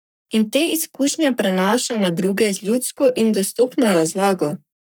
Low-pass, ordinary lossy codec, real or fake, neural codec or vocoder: none; none; fake; codec, 44.1 kHz, 3.4 kbps, Pupu-Codec